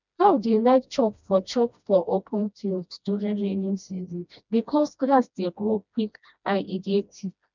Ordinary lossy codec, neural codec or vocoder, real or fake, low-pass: none; codec, 16 kHz, 1 kbps, FreqCodec, smaller model; fake; 7.2 kHz